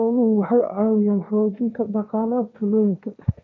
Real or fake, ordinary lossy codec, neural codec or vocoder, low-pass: fake; none; codec, 16 kHz, 1.1 kbps, Voila-Tokenizer; none